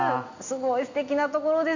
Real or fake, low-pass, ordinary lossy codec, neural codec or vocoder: real; 7.2 kHz; none; none